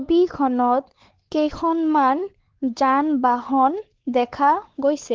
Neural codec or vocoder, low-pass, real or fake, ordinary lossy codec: codec, 16 kHz, 4 kbps, X-Codec, WavLM features, trained on Multilingual LibriSpeech; 7.2 kHz; fake; Opus, 16 kbps